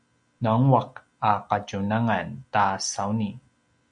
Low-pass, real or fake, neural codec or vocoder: 9.9 kHz; real; none